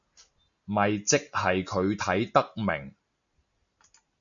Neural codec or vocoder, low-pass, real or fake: none; 7.2 kHz; real